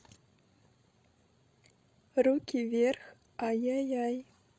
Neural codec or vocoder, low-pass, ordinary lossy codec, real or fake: codec, 16 kHz, 16 kbps, FreqCodec, larger model; none; none; fake